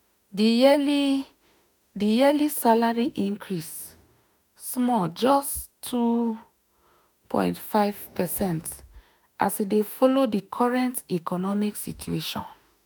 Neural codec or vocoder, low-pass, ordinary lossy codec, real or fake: autoencoder, 48 kHz, 32 numbers a frame, DAC-VAE, trained on Japanese speech; none; none; fake